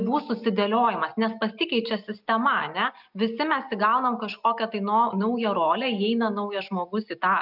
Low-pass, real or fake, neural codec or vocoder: 5.4 kHz; real; none